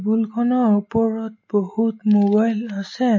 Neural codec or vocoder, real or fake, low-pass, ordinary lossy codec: none; real; 7.2 kHz; MP3, 32 kbps